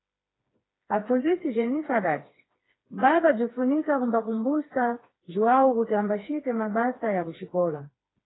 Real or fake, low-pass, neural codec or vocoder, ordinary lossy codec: fake; 7.2 kHz; codec, 16 kHz, 2 kbps, FreqCodec, smaller model; AAC, 16 kbps